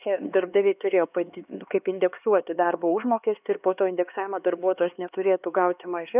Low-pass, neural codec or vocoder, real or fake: 3.6 kHz; codec, 16 kHz, 2 kbps, X-Codec, HuBERT features, trained on LibriSpeech; fake